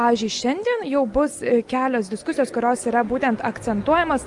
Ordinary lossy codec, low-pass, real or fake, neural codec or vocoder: Opus, 32 kbps; 10.8 kHz; real; none